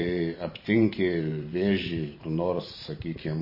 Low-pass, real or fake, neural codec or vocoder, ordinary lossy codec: 5.4 kHz; fake; vocoder, 44.1 kHz, 128 mel bands every 512 samples, BigVGAN v2; MP3, 24 kbps